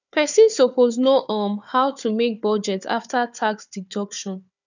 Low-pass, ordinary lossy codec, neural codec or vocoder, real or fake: 7.2 kHz; none; codec, 16 kHz, 4 kbps, FunCodec, trained on Chinese and English, 50 frames a second; fake